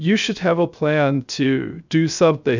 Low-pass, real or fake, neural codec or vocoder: 7.2 kHz; fake; codec, 16 kHz, 0.3 kbps, FocalCodec